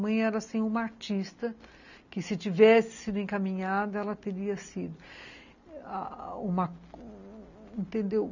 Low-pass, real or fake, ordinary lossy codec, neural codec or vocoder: 7.2 kHz; real; none; none